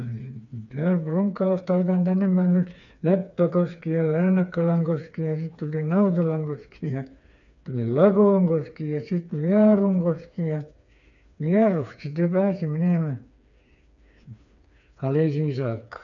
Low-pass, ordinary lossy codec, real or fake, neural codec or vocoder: 7.2 kHz; none; fake; codec, 16 kHz, 4 kbps, FreqCodec, smaller model